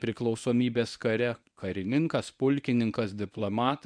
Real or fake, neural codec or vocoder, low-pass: fake; codec, 24 kHz, 0.9 kbps, WavTokenizer, medium speech release version 1; 9.9 kHz